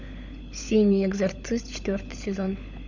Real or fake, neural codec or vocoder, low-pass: fake; codec, 16 kHz, 16 kbps, FunCodec, trained on LibriTTS, 50 frames a second; 7.2 kHz